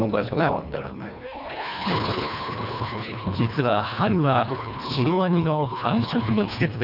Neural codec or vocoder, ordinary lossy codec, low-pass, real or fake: codec, 24 kHz, 1.5 kbps, HILCodec; none; 5.4 kHz; fake